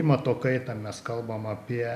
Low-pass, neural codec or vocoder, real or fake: 14.4 kHz; none; real